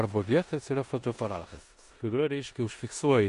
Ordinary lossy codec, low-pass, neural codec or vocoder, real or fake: MP3, 48 kbps; 10.8 kHz; codec, 16 kHz in and 24 kHz out, 0.9 kbps, LongCat-Audio-Codec, four codebook decoder; fake